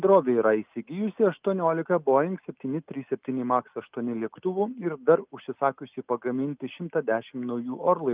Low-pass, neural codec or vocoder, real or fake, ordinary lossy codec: 3.6 kHz; none; real; Opus, 24 kbps